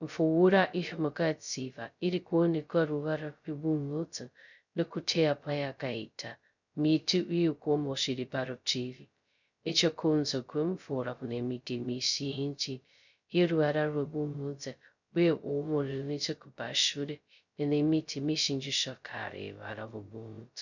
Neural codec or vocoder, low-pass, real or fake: codec, 16 kHz, 0.2 kbps, FocalCodec; 7.2 kHz; fake